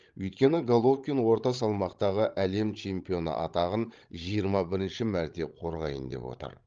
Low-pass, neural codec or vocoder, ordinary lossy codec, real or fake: 7.2 kHz; codec, 16 kHz, 16 kbps, FreqCodec, larger model; Opus, 32 kbps; fake